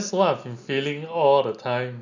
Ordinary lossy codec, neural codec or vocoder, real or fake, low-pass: none; none; real; 7.2 kHz